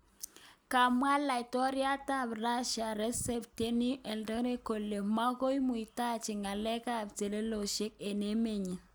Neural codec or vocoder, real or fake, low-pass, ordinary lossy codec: none; real; none; none